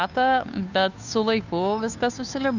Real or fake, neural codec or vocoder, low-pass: fake; codec, 16 kHz, 2 kbps, FunCodec, trained on Chinese and English, 25 frames a second; 7.2 kHz